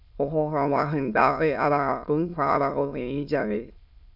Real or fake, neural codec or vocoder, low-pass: fake; autoencoder, 22.05 kHz, a latent of 192 numbers a frame, VITS, trained on many speakers; 5.4 kHz